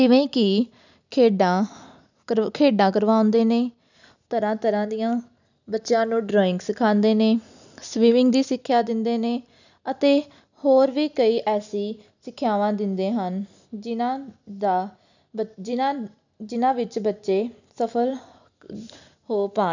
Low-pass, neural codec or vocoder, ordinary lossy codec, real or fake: 7.2 kHz; none; none; real